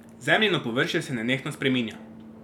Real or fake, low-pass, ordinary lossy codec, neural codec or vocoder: real; 19.8 kHz; none; none